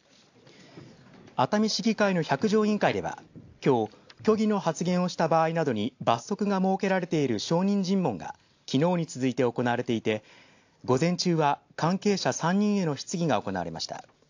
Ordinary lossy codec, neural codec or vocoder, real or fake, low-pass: AAC, 48 kbps; none; real; 7.2 kHz